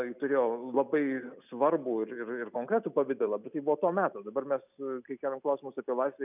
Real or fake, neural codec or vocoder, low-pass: real; none; 3.6 kHz